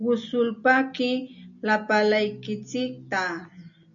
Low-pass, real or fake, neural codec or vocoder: 7.2 kHz; real; none